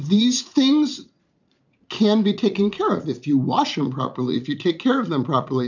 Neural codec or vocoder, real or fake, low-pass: vocoder, 44.1 kHz, 80 mel bands, Vocos; fake; 7.2 kHz